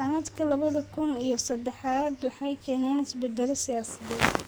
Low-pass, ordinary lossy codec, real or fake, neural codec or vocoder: none; none; fake; codec, 44.1 kHz, 2.6 kbps, SNAC